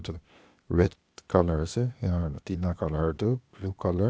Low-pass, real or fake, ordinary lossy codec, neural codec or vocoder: none; fake; none; codec, 16 kHz, 0.8 kbps, ZipCodec